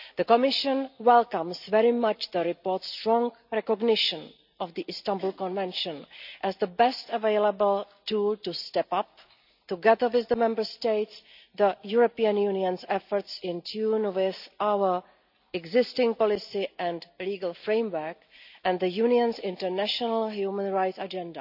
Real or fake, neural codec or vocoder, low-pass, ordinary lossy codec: real; none; 5.4 kHz; none